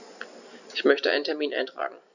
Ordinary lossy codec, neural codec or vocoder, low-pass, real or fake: none; none; 7.2 kHz; real